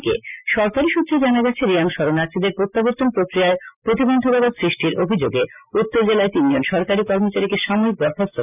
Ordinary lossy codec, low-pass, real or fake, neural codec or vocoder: none; 3.6 kHz; real; none